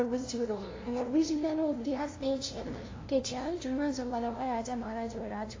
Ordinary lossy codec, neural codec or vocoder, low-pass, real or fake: AAC, 32 kbps; codec, 16 kHz, 0.5 kbps, FunCodec, trained on LibriTTS, 25 frames a second; 7.2 kHz; fake